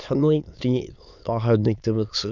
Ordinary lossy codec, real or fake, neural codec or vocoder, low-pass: none; fake; autoencoder, 22.05 kHz, a latent of 192 numbers a frame, VITS, trained on many speakers; 7.2 kHz